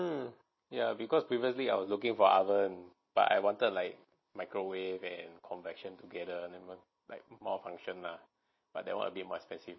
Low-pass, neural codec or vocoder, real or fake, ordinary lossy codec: 7.2 kHz; none; real; MP3, 24 kbps